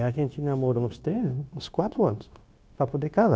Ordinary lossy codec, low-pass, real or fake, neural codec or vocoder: none; none; fake; codec, 16 kHz, 0.9 kbps, LongCat-Audio-Codec